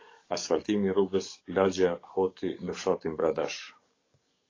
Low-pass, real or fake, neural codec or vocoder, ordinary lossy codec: 7.2 kHz; fake; codec, 44.1 kHz, 7.8 kbps, DAC; AAC, 32 kbps